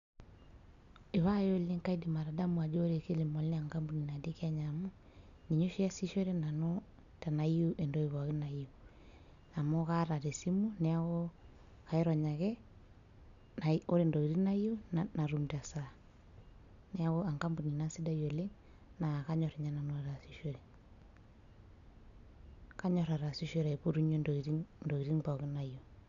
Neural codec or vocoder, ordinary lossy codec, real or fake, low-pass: none; none; real; 7.2 kHz